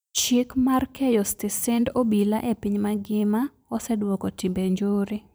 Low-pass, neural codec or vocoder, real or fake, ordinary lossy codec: none; vocoder, 44.1 kHz, 128 mel bands every 512 samples, BigVGAN v2; fake; none